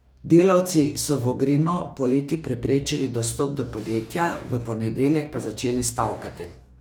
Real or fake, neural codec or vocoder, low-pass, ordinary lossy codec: fake; codec, 44.1 kHz, 2.6 kbps, DAC; none; none